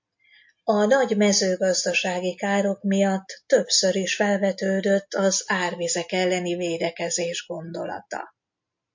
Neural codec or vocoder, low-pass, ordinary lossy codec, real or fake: none; 7.2 kHz; MP3, 48 kbps; real